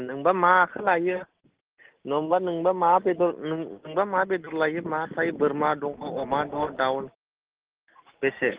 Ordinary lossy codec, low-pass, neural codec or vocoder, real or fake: Opus, 16 kbps; 3.6 kHz; none; real